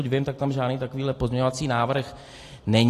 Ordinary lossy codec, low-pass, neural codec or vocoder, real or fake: AAC, 48 kbps; 14.4 kHz; none; real